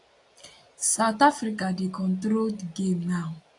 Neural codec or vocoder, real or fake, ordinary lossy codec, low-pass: none; real; AAC, 48 kbps; 10.8 kHz